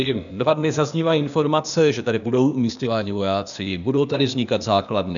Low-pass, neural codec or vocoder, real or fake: 7.2 kHz; codec, 16 kHz, 0.8 kbps, ZipCodec; fake